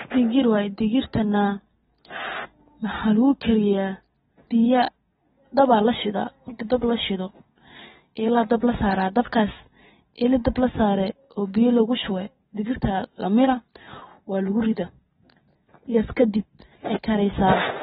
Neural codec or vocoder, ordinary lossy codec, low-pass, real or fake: none; AAC, 16 kbps; 19.8 kHz; real